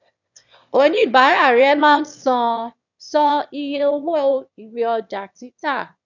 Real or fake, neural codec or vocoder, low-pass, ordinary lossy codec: fake; autoencoder, 22.05 kHz, a latent of 192 numbers a frame, VITS, trained on one speaker; 7.2 kHz; none